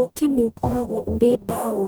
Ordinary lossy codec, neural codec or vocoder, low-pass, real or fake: none; codec, 44.1 kHz, 0.9 kbps, DAC; none; fake